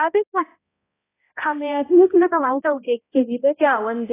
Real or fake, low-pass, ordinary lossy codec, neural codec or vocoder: fake; 3.6 kHz; AAC, 16 kbps; codec, 16 kHz, 0.5 kbps, X-Codec, HuBERT features, trained on balanced general audio